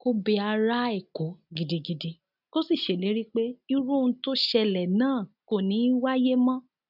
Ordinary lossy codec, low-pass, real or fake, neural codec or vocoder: none; 5.4 kHz; real; none